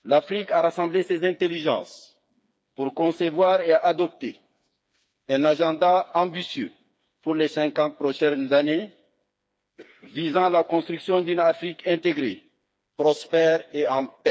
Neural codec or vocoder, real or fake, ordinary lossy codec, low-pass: codec, 16 kHz, 4 kbps, FreqCodec, smaller model; fake; none; none